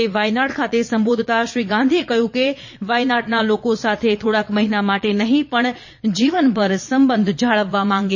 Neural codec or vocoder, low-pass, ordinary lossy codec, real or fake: vocoder, 22.05 kHz, 80 mel bands, Vocos; 7.2 kHz; MP3, 32 kbps; fake